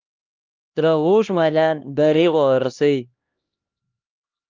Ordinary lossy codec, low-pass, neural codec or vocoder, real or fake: Opus, 32 kbps; 7.2 kHz; codec, 16 kHz, 1 kbps, X-Codec, HuBERT features, trained on LibriSpeech; fake